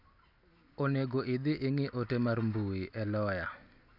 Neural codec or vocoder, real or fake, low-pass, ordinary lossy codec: none; real; 5.4 kHz; none